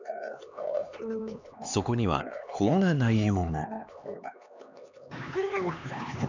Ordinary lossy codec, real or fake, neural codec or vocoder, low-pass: none; fake; codec, 16 kHz, 2 kbps, X-Codec, HuBERT features, trained on LibriSpeech; 7.2 kHz